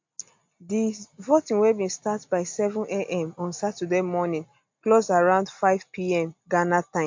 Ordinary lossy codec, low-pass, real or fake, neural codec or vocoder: MP3, 48 kbps; 7.2 kHz; real; none